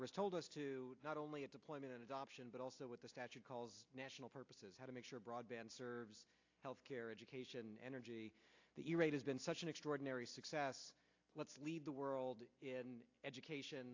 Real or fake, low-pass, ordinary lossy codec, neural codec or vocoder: real; 7.2 kHz; AAC, 48 kbps; none